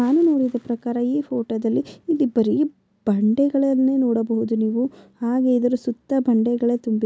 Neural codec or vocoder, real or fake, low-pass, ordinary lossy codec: none; real; none; none